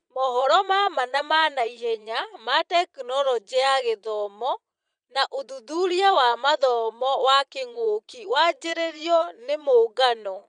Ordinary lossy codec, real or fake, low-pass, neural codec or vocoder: none; fake; 9.9 kHz; vocoder, 22.05 kHz, 80 mel bands, Vocos